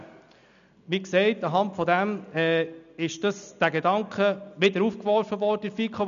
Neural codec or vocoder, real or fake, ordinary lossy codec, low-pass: none; real; none; 7.2 kHz